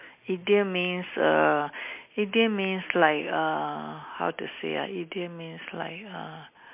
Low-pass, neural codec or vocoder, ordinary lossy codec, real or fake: 3.6 kHz; none; MP3, 32 kbps; real